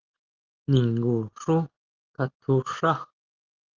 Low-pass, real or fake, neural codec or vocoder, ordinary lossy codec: 7.2 kHz; real; none; Opus, 16 kbps